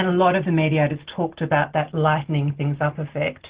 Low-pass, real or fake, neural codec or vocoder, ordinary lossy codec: 3.6 kHz; real; none; Opus, 16 kbps